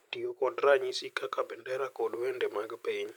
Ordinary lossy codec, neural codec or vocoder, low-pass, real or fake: none; none; 19.8 kHz; real